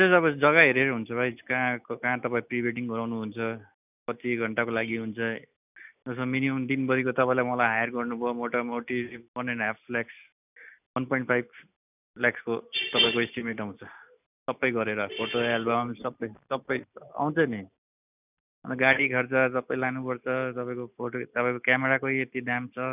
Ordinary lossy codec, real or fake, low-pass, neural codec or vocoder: none; real; 3.6 kHz; none